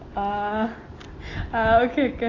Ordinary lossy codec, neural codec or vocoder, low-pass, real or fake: AAC, 32 kbps; none; 7.2 kHz; real